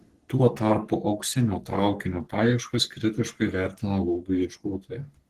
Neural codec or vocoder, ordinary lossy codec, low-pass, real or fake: codec, 44.1 kHz, 3.4 kbps, Pupu-Codec; Opus, 16 kbps; 14.4 kHz; fake